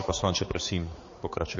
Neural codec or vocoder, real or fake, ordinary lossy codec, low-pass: codec, 16 kHz, 4 kbps, X-Codec, HuBERT features, trained on balanced general audio; fake; MP3, 32 kbps; 7.2 kHz